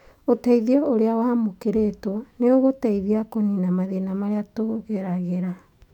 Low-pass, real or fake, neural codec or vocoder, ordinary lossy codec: 19.8 kHz; fake; autoencoder, 48 kHz, 128 numbers a frame, DAC-VAE, trained on Japanese speech; none